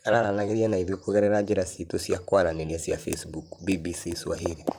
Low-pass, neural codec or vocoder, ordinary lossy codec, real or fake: none; vocoder, 44.1 kHz, 128 mel bands, Pupu-Vocoder; none; fake